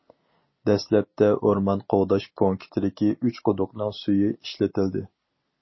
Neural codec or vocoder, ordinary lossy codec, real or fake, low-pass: none; MP3, 24 kbps; real; 7.2 kHz